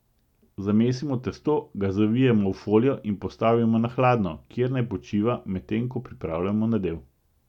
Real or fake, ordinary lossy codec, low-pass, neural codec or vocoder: real; none; 19.8 kHz; none